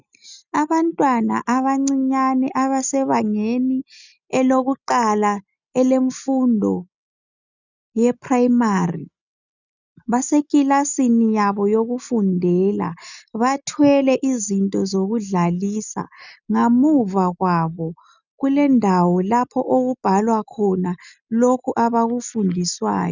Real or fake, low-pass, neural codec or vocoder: real; 7.2 kHz; none